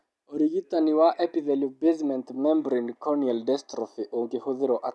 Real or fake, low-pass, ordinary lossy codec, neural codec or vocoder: real; none; none; none